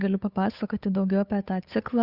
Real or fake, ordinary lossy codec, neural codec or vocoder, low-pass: fake; Opus, 64 kbps; codec, 16 kHz, 8 kbps, FunCodec, trained on Chinese and English, 25 frames a second; 5.4 kHz